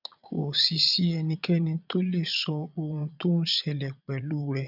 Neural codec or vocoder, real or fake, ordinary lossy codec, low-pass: vocoder, 22.05 kHz, 80 mel bands, Vocos; fake; none; 5.4 kHz